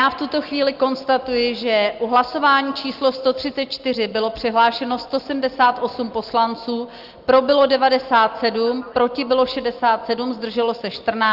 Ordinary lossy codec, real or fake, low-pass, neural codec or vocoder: Opus, 32 kbps; real; 5.4 kHz; none